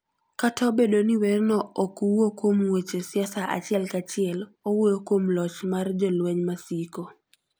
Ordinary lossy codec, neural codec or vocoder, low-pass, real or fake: none; none; none; real